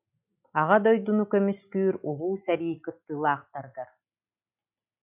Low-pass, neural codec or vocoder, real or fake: 3.6 kHz; none; real